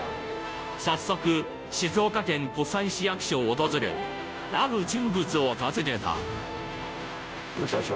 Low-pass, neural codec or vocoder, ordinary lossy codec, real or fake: none; codec, 16 kHz, 0.5 kbps, FunCodec, trained on Chinese and English, 25 frames a second; none; fake